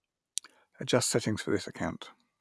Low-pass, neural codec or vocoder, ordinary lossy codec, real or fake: none; none; none; real